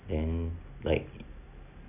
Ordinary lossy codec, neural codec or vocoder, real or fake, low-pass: none; none; real; 3.6 kHz